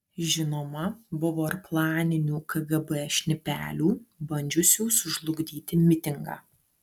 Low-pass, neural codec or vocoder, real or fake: 19.8 kHz; none; real